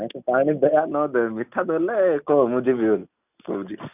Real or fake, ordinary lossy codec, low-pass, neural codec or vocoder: real; none; 3.6 kHz; none